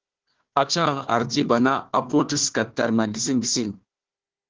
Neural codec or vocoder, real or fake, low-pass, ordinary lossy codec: codec, 16 kHz, 1 kbps, FunCodec, trained on Chinese and English, 50 frames a second; fake; 7.2 kHz; Opus, 16 kbps